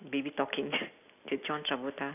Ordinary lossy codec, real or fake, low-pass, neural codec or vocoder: none; real; 3.6 kHz; none